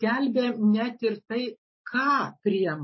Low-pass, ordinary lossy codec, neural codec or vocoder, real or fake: 7.2 kHz; MP3, 24 kbps; none; real